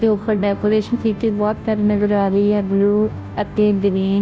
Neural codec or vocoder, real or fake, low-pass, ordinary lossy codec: codec, 16 kHz, 0.5 kbps, FunCodec, trained on Chinese and English, 25 frames a second; fake; none; none